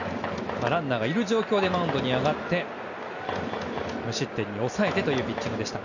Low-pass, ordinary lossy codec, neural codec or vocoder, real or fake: 7.2 kHz; none; none; real